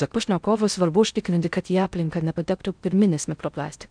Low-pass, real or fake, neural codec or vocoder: 9.9 kHz; fake; codec, 16 kHz in and 24 kHz out, 0.6 kbps, FocalCodec, streaming, 2048 codes